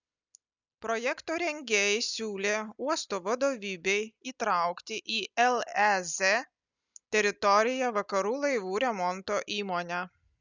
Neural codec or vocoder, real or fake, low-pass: none; real; 7.2 kHz